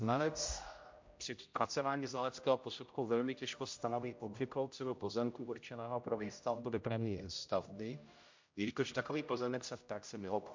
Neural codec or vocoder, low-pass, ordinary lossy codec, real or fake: codec, 16 kHz, 0.5 kbps, X-Codec, HuBERT features, trained on general audio; 7.2 kHz; MP3, 48 kbps; fake